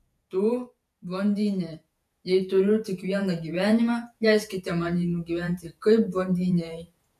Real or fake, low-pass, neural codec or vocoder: fake; 14.4 kHz; vocoder, 48 kHz, 128 mel bands, Vocos